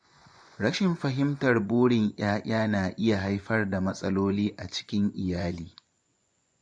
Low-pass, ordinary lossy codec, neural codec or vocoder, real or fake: 9.9 kHz; MP3, 48 kbps; vocoder, 24 kHz, 100 mel bands, Vocos; fake